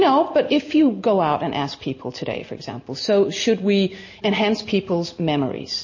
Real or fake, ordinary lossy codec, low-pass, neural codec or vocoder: real; MP3, 32 kbps; 7.2 kHz; none